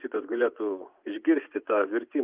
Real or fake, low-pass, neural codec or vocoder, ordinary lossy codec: fake; 3.6 kHz; vocoder, 24 kHz, 100 mel bands, Vocos; Opus, 24 kbps